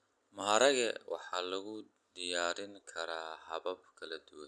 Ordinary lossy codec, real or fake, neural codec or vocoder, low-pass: none; real; none; none